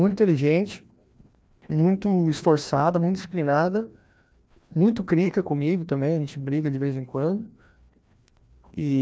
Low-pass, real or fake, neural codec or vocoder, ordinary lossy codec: none; fake; codec, 16 kHz, 1 kbps, FreqCodec, larger model; none